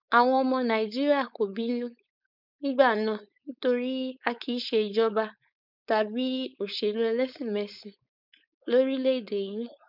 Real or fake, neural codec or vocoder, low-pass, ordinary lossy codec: fake; codec, 16 kHz, 4.8 kbps, FACodec; 5.4 kHz; none